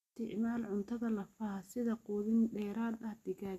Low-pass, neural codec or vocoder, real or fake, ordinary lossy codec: 14.4 kHz; none; real; none